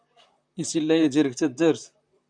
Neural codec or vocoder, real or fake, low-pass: vocoder, 44.1 kHz, 128 mel bands, Pupu-Vocoder; fake; 9.9 kHz